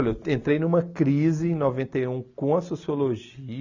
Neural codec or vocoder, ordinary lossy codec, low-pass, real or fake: none; none; 7.2 kHz; real